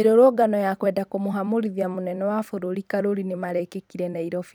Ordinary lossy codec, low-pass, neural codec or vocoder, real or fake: none; none; vocoder, 44.1 kHz, 128 mel bands every 256 samples, BigVGAN v2; fake